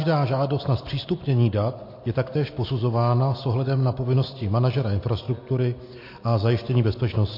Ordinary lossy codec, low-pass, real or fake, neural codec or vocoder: MP3, 32 kbps; 5.4 kHz; real; none